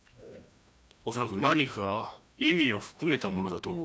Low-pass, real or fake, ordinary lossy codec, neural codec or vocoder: none; fake; none; codec, 16 kHz, 1 kbps, FreqCodec, larger model